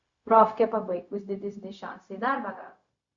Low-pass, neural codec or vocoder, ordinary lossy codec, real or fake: 7.2 kHz; codec, 16 kHz, 0.4 kbps, LongCat-Audio-Codec; Opus, 64 kbps; fake